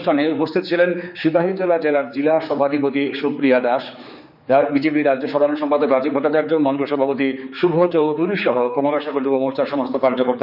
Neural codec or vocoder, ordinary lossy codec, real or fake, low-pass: codec, 16 kHz, 4 kbps, X-Codec, HuBERT features, trained on general audio; none; fake; 5.4 kHz